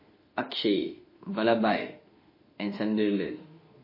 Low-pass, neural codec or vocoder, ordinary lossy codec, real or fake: 5.4 kHz; autoencoder, 48 kHz, 32 numbers a frame, DAC-VAE, trained on Japanese speech; MP3, 24 kbps; fake